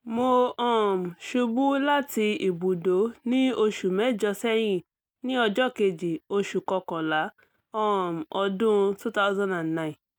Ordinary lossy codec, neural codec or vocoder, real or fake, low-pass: none; none; real; 19.8 kHz